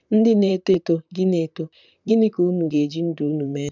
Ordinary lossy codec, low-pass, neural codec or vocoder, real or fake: none; 7.2 kHz; vocoder, 44.1 kHz, 128 mel bands, Pupu-Vocoder; fake